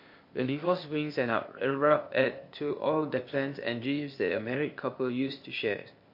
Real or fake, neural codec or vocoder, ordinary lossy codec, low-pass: fake; codec, 16 kHz, 0.8 kbps, ZipCodec; MP3, 32 kbps; 5.4 kHz